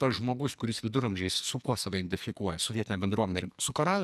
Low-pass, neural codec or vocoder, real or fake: 14.4 kHz; codec, 44.1 kHz, 2.6 kbps, SNAC; fake